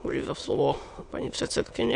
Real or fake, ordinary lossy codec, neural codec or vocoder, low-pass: fake; AAC, 64 kbps; autoencoder, 22.05 kHz, a latent of 192 numbers a frame, VITS, trained on many speakers; 9.9 kHz